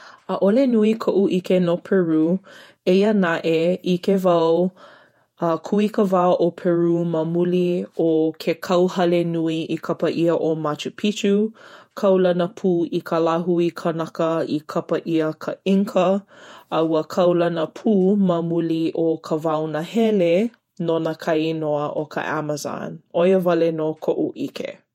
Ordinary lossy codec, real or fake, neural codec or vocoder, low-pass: MP3, 64 kbps; fake; vocoder, 48 kHz, 128 mel bands, Vocos; 19.8 kHz